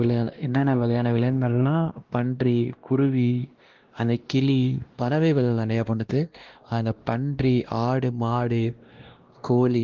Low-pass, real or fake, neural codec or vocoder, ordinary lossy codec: 7.2 kHz; fake; codec, 16 kHz, 1 kbps, X-Codec, WavLM features, trained on Multilingual LibriSpeech; Opus, 16 kbps